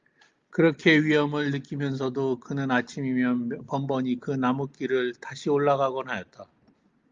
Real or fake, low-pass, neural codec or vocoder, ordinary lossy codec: real; 7.2 kHz; none; Opus, 32 kbps